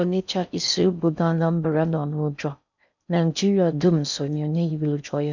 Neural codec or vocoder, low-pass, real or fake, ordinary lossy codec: codec, 16 kHz in and 24 kHz out, 0.6 kbps, FocalCodec, streaming, 4096 codes; 7.2 kHz; fake; none